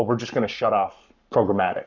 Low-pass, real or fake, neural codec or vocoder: 7.2 kHz; fake; codec, 44.1 kHz, 7.8 kbps, Pupu-Codec